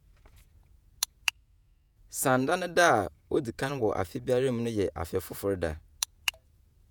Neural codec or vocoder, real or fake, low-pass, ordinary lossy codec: vocoder, 48 kHz, 128 mel bands, Vocos; fake; none; none